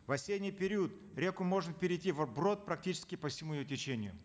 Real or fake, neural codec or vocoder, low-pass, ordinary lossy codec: real; none; none; none